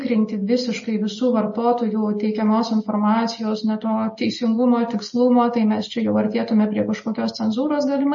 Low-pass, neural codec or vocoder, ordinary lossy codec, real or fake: 7.2 kHz; none; MP3, 32 kbps; real